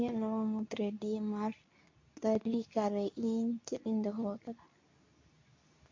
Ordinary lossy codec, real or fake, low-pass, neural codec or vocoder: MP3, 48 kbps; fake; 7.2 kHz; codec, 24 kHz, 0.9 kbps, WavTokenizer, medium speech release version 2